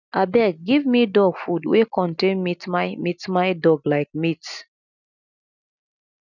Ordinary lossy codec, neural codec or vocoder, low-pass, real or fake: none; none; 7.2 kHz; real